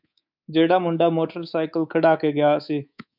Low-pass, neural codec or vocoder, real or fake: 5.4 kHz; codec, 24 kHz, 3.1 kbps, DualCodec; fake